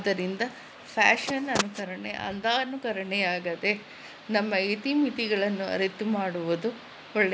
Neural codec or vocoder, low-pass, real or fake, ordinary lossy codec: none; none; real; none